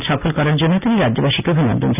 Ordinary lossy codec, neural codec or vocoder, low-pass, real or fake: none; none; 3.6 kHz; real